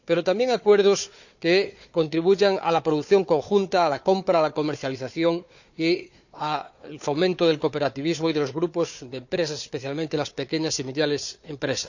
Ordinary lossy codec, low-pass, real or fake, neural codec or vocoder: none; 7.2 kHz; fake; codec, 16 kHz, 4 kbps, FunCodec, trained on Chinese and English, 50 frames a second